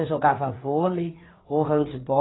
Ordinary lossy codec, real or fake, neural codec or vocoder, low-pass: AAC, 16 kbps; fake; codec, 16 kHz, 4 kbps, FreqCodec, larger model; 7.2 kHz